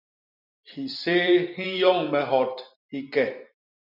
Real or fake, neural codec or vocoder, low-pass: fake; vocoder, 44.1 kHz, 128 mel bands every 256 samples, BigVGAN v2; 5.4 kHz